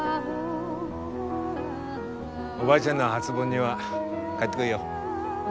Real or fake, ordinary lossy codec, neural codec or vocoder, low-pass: real; none; none; none